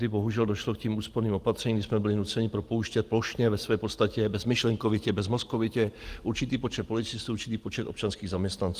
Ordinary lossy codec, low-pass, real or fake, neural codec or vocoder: Opus, 24 kbps; 14.4 kHz; real; none